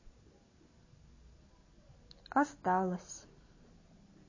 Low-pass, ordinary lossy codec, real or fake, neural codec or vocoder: 7.2 kHz; MP3, 32 kbps; real; none